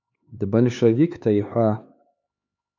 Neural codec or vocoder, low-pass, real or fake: codec, 16 kHz, 4 kbps, X-Codec, HuBERT features, trained on LibriSpeech; 7.2 kHz; fake